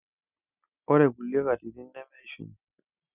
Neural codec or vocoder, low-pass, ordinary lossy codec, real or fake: none; 3.6 kHz; none; real